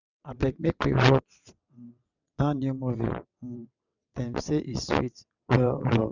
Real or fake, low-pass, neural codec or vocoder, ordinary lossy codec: fake; 7.2 kHz; vocoder, 22.05 kHz, 80 mel bands, WaveNeXt; none